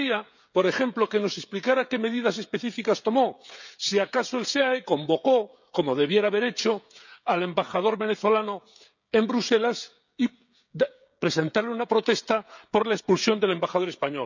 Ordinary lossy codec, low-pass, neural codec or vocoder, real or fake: none; 7.2 kHz; codec, 16 kHz, 16 kbps, FreqCodec, smaller model; fake